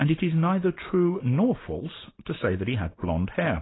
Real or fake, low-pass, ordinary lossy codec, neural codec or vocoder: real; 7.2 kHz; AAC, 16 kbps; none